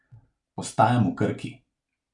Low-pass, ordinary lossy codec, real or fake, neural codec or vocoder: 10.8 kHz; none; real; none